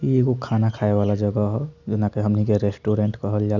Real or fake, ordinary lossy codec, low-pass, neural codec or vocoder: real; none; 7.2 kHz; none